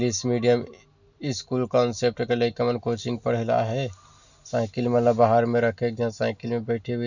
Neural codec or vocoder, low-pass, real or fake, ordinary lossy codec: none; 7.2 kHz; real; none